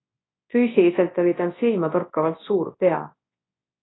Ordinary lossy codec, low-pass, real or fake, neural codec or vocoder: AAC, 16 kbps; 7.2 kHz; fake; codec, 24 kHz, 0.9 kbps, WavTokenizer, large speech release